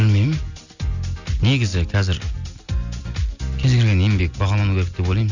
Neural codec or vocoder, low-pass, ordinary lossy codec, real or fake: none; 7.2 kHz; none; real